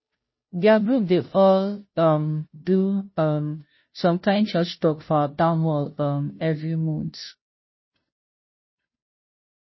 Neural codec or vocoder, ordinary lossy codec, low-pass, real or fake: codec, 16 kHz, 0.5 kbps, FunCodec, trained on Chinese and English, 25 frames a second; MP3, 24 kbps; 7.2 kHz; fake